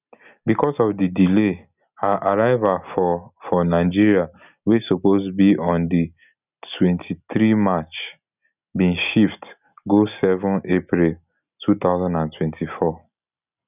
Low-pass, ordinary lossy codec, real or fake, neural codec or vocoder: 3.6 kHz; none; real; none